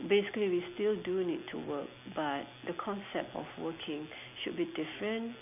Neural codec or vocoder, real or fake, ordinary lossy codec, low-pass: none; real; none; 3.6 kHz